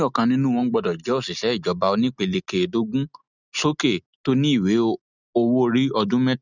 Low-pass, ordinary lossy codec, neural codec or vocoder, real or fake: 7.2 kHz; none; none; real